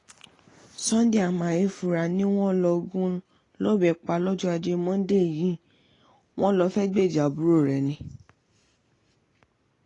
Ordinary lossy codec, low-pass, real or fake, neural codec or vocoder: AAC, 32 kbps; 10.8 kHz; real; none